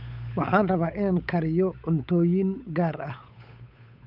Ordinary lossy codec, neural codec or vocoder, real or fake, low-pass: none; codec, 16 kHz, 8 kbps, FunCodec, trained on Chinese and English, 25 frames a second; fake; 5.4 kHz